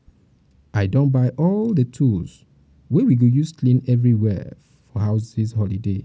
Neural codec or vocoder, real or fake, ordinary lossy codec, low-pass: none; real; none; none